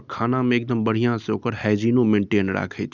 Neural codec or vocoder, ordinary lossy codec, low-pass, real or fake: none; none; 7.2 kHz; real